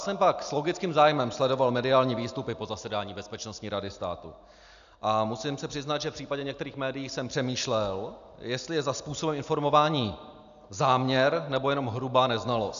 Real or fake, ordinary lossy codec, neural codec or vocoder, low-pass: real; AAC, 96 kbps; none; 7.2 kHz